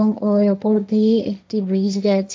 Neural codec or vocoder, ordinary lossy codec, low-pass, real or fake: codec, 16 kHz, 1.1 kbps, Voila-Tokenizer; none; none; fake